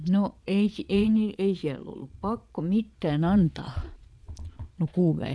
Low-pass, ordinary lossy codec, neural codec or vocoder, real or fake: none; none; vocoder, 22.05 kHz, 80 mel bands, Vocos; fake